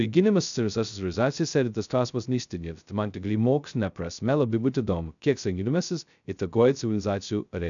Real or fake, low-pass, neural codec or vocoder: fake; 7.2 kHz; codec, 16 kHz, 0.2 kbps, FocalCodec